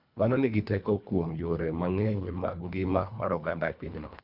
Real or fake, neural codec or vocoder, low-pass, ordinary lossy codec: fake; codec, 24 kHz, 1.5 kbps, HILCodec; 5.4 kHz; none